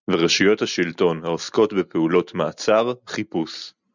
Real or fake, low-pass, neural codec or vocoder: real; 7.2 kHz; none